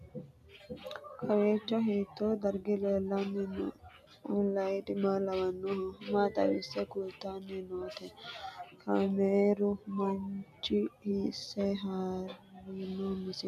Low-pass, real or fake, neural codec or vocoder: 14.4 kHz; real; none